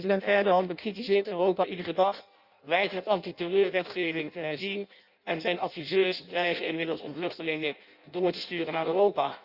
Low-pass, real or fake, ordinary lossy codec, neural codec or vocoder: 5.4 kHz; fake; Opus, 64 kbps; codec, 16 kHz in and 24 kHz out, 0.6 kbps, FireRedTTS-2 codec